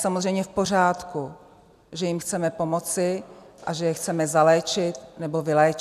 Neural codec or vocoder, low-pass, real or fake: none; 14.4 kHz; real